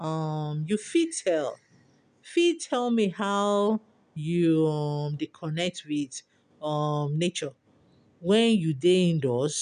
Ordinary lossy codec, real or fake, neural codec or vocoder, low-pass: none; real; none; 9.9 kHz